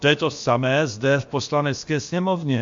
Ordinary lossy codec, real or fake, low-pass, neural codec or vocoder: MP3, 48 kbps; fake; 7.2 kHz; codec, 16 kHz, about 1 kbps, DyCAST, with the encoder's durations